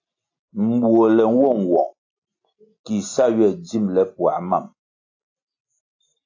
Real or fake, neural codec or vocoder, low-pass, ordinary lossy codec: real; none; 7.2 kHz; AAC, 48 kbps